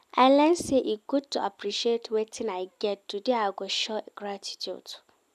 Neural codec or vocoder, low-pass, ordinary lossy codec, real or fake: none; 14.4 kHz; none; real